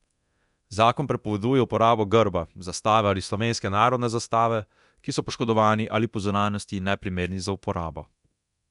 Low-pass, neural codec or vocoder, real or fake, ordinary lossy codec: 10.8 kHz; codec, 24 kHz, 0.9 kbps, DualCodec; fake; none